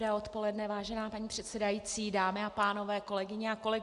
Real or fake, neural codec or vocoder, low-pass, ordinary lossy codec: real; none; 10.8 kHz; Opus, 64 kbps